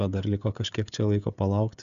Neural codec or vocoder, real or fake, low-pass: codec, 16 kHz, 16 kbps, FreqCodec, smaller model; fake; 7.2 kHz